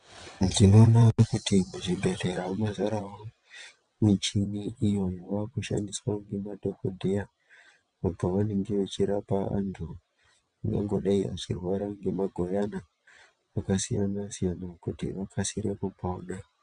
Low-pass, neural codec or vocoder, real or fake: 9.9 kHz; vocoder, 22.05 kHz, 80 mel bands, WaveNeXt; fake